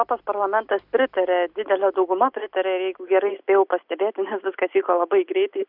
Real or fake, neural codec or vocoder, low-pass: real; none; 5.4 kHz